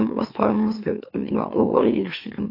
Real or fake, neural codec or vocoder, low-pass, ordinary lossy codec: fake; autoencoder, 44.1 kHz, a latent of 192 numbers a frame, MeloTTS; 5.4 kHz; AAC, 32 kbps